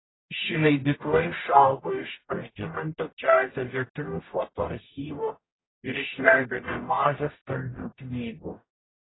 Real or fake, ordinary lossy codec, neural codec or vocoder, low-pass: fake; AAC, 16 kbps; codec, 44.1 kHz, 0.9 kbps, DAC; 7.2 kHz